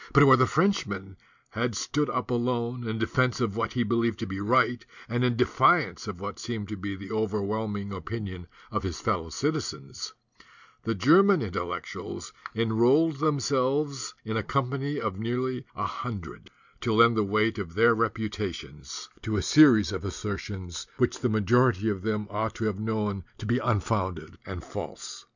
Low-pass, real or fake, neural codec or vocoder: 7.2 kHz; real; none